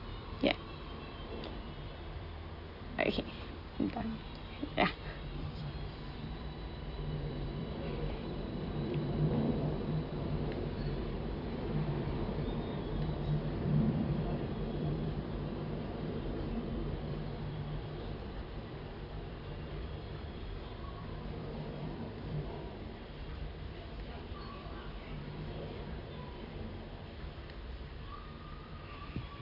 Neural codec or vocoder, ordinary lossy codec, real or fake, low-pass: vocoder, 44.1 kHz, 128 mel bands every 256 samples, BigVGAN v2; AAC, 48 kbps; fake; 5.4 kHz